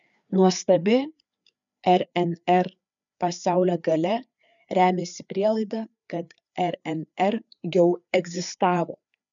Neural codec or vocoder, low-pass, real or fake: codec, 16 kHz, 4 kbps, FreqCodec, larger model; 7.2 kHz; fake